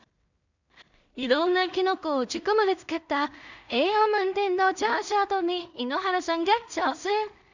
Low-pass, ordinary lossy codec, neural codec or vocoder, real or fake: 7.2 kHz; none; codec, 16 kHz in and 24 kHz out, 0.4 kbps, LongCat-Audio-Codec, two codebook decoder; fake